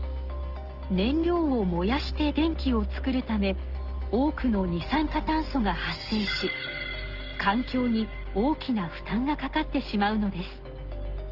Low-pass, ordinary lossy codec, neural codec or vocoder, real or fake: 5.4 kHz; Opus, 16 kbps; none; real